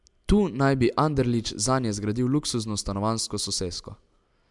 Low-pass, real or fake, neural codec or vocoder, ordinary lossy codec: 10.8 kHz; real; none; MP3, 96 kbps